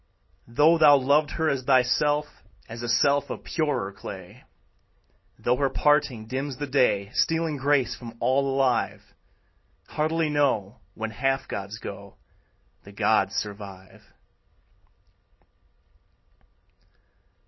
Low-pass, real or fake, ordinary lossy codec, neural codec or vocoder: 7.2 kHz; real; MP3, 24 kbps; none